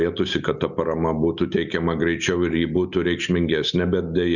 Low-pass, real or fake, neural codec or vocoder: 7.2 kHz; real; none